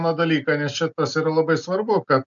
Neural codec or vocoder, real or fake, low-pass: none; real; 7.2 kHz